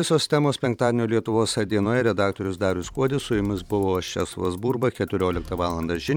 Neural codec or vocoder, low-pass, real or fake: vocoder, 44.1 kHz, 128 mel bands every 512 samples, BigVGAN v2; 19.8 kHz; fake